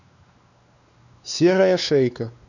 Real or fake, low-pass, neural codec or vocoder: fake; 7.2 kHz; codec, 16 kHz, 2 kbps, X-Codec, WavLM features, trained on Multilingual LibriSpeech